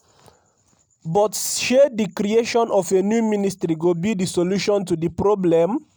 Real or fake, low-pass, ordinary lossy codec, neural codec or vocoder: real; none; none; none